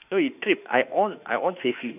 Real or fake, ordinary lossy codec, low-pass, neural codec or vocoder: fake; none; 3.6 kHz; autoencoder, 48 kHz, 32 numbers a frame, DAC-VAE, trained on Japanese speech